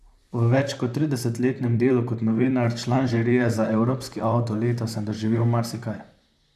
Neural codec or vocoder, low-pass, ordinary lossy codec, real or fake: vocoder, 44.1 kHz, 128 mel bands, Pupu-Vocoder; 14.4 kHz; none; fake